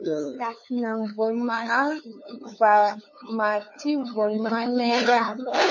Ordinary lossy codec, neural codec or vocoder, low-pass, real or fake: MP3, 32 kbps; codec, 16 kHz, 2 kbps, FunCodec, trained on LibriTTS, 25 frames a second; 7.2 kHz; fake